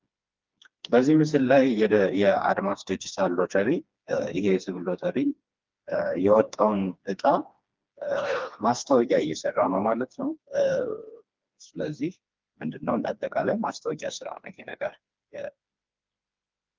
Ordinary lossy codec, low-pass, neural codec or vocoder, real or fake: Opus, 32 kbps; 7.2 kHz; codec, 16 kHz, 2 kbps, FreqCodec, smaller model; fake